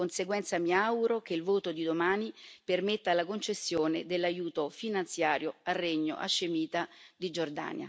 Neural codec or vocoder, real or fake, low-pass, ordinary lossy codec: none; real; none; none